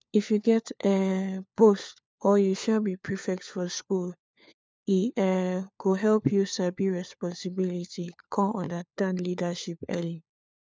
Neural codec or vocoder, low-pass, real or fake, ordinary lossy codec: codec, 16 kHz, 4 kbps, FunCodec, trained on LibriTTS, 50 frames a second; none; fake; none